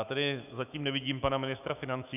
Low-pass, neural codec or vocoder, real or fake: 3.6 kHz; autoencoder, 48 kHz, 128 numbers a frame, DAC-VAE, trained on Japanese speech; fake